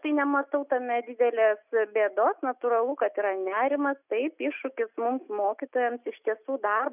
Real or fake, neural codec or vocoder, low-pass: real; none; 3.6 kHz